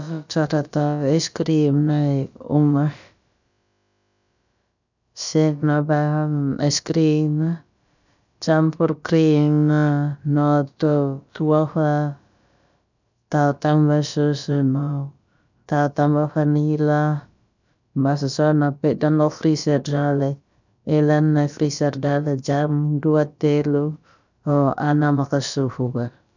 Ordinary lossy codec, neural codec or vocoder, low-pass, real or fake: none; codec, 16 kHz, about 1 kbps, DyCAST, with the encoder's durations; 7.2 kHz; fake